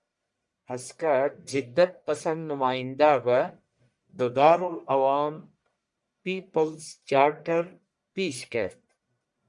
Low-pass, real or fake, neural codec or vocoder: 10.8 kHz; fake; codec, 44.1 kHz, 1.7 kbps, Pupu-Codec